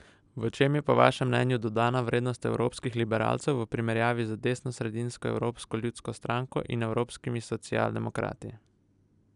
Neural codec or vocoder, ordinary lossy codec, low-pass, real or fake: none; none; 10.8 kHz; real